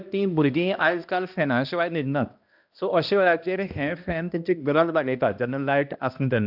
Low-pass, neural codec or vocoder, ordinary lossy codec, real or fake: 5.4 kHz; codec, 16 kHz, 1 kbps, X-Codec, HuBERT features, trained on balanced general audio; AAC, 48 kbps; fake